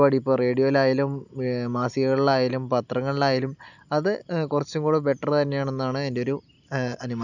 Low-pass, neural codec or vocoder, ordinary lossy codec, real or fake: 7.2 kHz; none; none; real